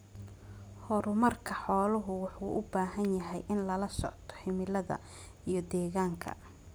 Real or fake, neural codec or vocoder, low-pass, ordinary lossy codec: real; none; none; none